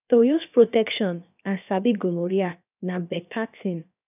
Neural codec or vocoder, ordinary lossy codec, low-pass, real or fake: codec, 16 kHz, 0.7 kbps, FocalCodec; none; 3.6 kHz; fake